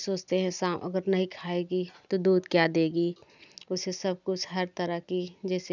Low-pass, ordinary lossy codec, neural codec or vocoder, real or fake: 7.2 kHz; none; none; real